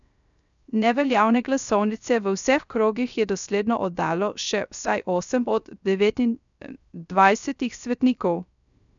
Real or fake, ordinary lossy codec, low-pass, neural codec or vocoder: fake; none; 7.2 kHz; codec, 16 kHz, 0.3 kbps, FocalCodec